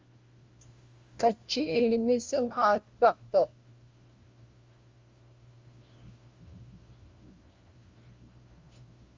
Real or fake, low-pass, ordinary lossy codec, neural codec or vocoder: fake; 7.2 kHz; Opus, 32 kbps; codec, 16 kHz, 1 kbps, FunCodec, trained on LibriTTS, 50 frames a second